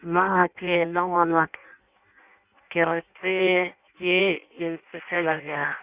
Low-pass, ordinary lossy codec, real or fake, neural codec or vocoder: 3.6 kHz; Opus, 64 kbps; fake; codec, 16 kHz in and 24 kHz out, 0.6 kbps, FireRedTTS-2 codec